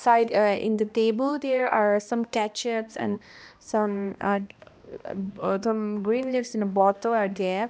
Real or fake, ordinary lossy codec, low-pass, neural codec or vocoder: fake; none; none; codec, 16 kHz, 1 kbps, X-Codec, HuBERT features, trained on balanced general audio